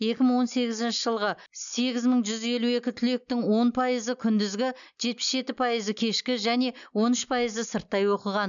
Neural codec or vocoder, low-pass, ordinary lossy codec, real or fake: none; 7.2 kHz; MP3, 96 kbps; real